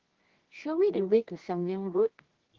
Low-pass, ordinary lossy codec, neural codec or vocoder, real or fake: 7.2 kHz; Opus, 16 kbps; codec, 24 kHz, 0.9 kbps, WavTokenizer, medium music audio release; fake